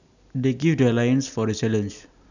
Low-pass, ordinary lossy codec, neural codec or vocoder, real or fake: 7.2 kHz; none; none; real